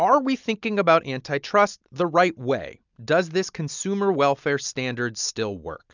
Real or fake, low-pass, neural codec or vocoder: real; 7.2 kHz; none